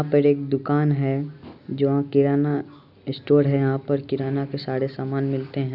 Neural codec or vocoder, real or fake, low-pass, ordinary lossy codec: none; real; 5.4 kHz; none